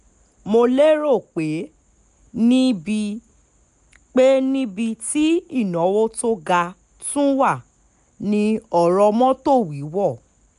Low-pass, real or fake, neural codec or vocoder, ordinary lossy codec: 10.8 kHz; real; none; none